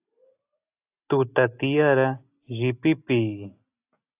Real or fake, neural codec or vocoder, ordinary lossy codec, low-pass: real; none; AAC, 32 kbps; 3.6 kHz